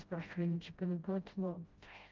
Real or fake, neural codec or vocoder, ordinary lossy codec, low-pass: fake; codec, 16 kHz, 0.5 kbps, FreqCodec, smaller model; Opus, 32 kbps; 7.2 kHz